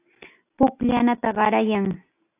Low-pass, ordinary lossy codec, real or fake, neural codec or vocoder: 3.6 kHz; AAC, 24 kbps; real; none